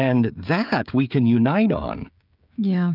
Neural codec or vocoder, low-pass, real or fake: codec, 16 kHz, 16 kbps, FreqCodec, smaller model; 5.4 kHz; fake